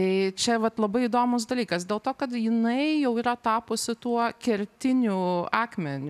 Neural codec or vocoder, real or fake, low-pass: none; real; 14.4 kHz